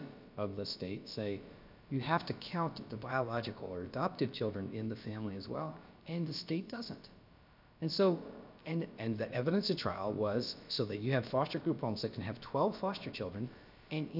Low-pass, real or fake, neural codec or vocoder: 5.4 kHz; fake; codec, 16 kHz, about 1 kbps, DyCAST, with the encoder's durations